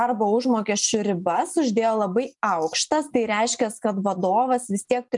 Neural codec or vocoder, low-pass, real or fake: none; 10.8 kHz; real